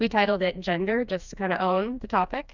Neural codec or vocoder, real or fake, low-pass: codec, 16 kHz, 2 kbps, FreqCodec, smaller model; fake; 7.2 kHz